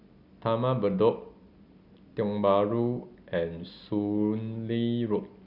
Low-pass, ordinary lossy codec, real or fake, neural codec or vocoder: 5.4 kHz; Opus, 64 kbps; real; none